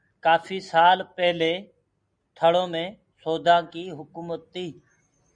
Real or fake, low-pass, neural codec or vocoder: real; 9.9 kHz; none